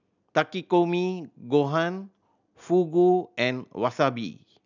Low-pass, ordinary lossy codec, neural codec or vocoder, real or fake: 7.2 kHz; none; none; real